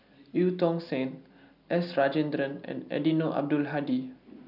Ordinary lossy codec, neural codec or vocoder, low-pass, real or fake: none; none; 5.4 kHz; real